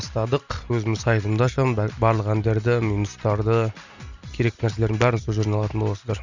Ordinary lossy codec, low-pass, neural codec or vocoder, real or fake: Opus, 64 kbps; 7.2 kHz; none; real